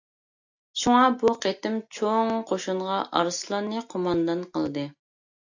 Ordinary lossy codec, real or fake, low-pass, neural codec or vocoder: AAC, 48 kbps; real; 7.2 kHz; none